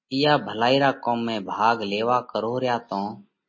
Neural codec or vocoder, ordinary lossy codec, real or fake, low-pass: none; MP3, 32 kbps; real; 7.2 kHz